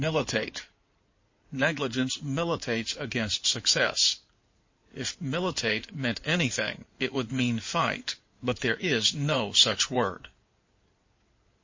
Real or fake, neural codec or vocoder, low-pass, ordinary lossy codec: fake; codec, 16 kHz in and 24 kHz out, 2.2 kbps, FireRedTTS-2 codec; 7.2 kHz; MP3, 32 kbps